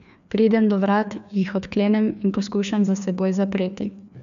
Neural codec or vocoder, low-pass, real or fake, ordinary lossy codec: codec, 16 kHz, 2 kbps, FreqCodec, larger model; 7.2 kHz; fake; none